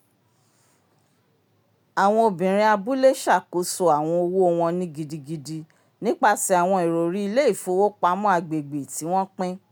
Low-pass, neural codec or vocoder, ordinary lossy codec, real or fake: none; none; none; real